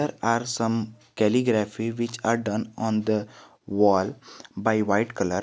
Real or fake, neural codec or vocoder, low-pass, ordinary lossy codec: real; none; none; none